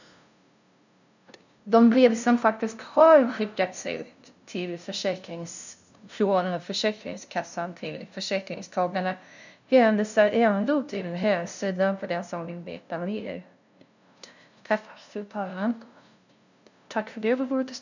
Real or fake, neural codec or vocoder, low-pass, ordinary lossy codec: fake; codec, 16 kHz, 0.5 kbps, FunCodec, trained on LibriTTS, 25 frames a second; 7.2 kHz; none